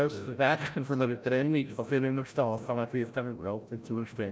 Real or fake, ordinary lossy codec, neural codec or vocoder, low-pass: fake; none; codec, 16 kHz, 0.5 kbps, FreqCodec, larger model; none